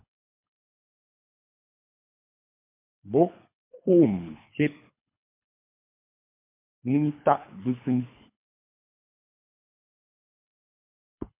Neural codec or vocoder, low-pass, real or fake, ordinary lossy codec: codec, 24 kHz, 3 kbps, HILCodec; 3.6 kHz; fake; MP3, 32 kbps